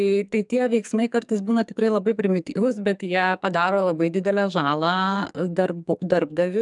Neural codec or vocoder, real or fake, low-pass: codec, 44.1 kHz, 2.6 kbps, SNAC; fake; 10.8 kHz